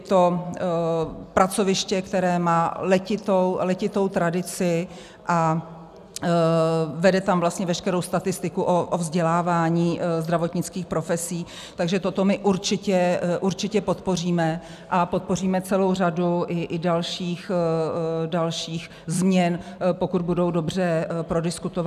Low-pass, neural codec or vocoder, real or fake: 14.4 kHz; none; real